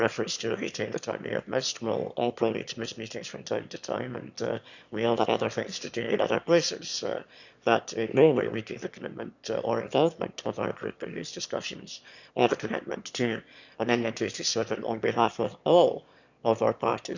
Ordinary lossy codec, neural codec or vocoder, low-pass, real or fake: none; autoencoder, 22.05 kHz, a latent of 192 numbers a frame, VITS, trained on one speaker; 7.2 kHz; fake